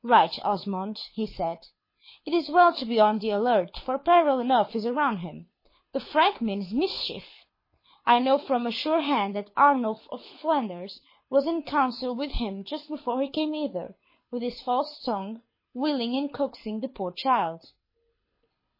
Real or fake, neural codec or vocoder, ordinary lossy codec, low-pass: fake; codec, 24 kHz, 6 kbps, HILCodec; MP3, 24 kbps; 5.4 kHz